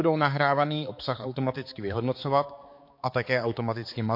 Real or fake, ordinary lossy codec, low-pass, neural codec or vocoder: fake; MP3, 32 kbps; 5.4 kHz; codec, 16 kHz, 4 kbps, X-Codec, HuBERT features, trained on balanced general audio